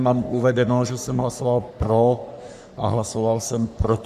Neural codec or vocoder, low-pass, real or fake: codec, 44.1 kHz, 3.4 kbps, Pupu-Codec; 14.4 kHz; fake